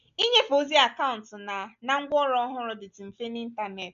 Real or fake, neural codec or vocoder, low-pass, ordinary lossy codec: real; none; 7.2 kHz; none